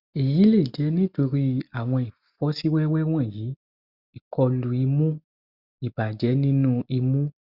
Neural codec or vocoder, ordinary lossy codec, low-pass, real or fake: none; Opus, 64 kbps; 5.4 kHz; real